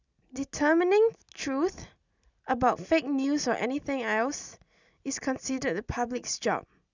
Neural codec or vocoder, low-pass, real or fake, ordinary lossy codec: none; 7.2 kHz; real; none